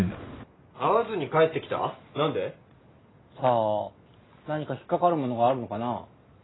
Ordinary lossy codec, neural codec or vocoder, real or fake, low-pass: AAC, 16 kbps; none; real; 7.2 kHz